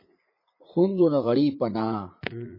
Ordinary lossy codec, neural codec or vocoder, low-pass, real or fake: MP3, 24 kbps; vocoder, 44.1 kHz, 80 mel bands, Vocos; 5.4 kHz; fake